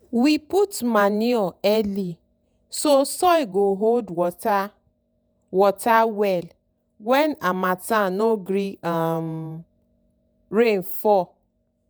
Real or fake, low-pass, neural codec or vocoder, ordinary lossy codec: fake; none; vocoder, 48 kHz, 128 mel bands, Vocos; none